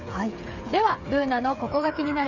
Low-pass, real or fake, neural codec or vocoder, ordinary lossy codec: 7.2 kHz; fake; codec, 16 kHz, 8 kbps, FreqCodec, smaller model; none